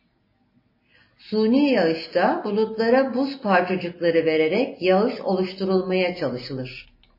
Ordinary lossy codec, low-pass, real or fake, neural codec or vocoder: MP3, 24 kbps; 5.4 kHz; real; none